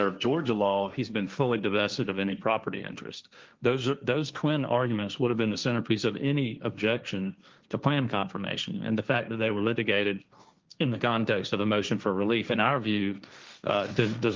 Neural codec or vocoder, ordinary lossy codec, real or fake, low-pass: codec, 16 kHz, 1.1 kbps, Voila-Tokenizer; Opus, 32 kbps; fake; 7.2 kHz